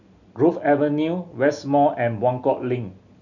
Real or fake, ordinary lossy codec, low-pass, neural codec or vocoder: real; none; 7.2 kHz; none